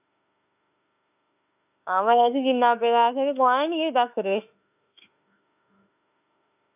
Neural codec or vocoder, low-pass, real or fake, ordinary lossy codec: autoencoder, 48 kHz, 32 numbers a frame, DAC-VAE, trained on Japanese speech; 3.6 kHz; fake; none